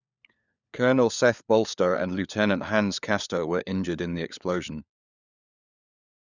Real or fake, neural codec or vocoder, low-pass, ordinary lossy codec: fake; codec, 16 kHz, 4 kbps, FunCodec, trained on LibriTTS, 50 frames a second; 7.2 kHz; none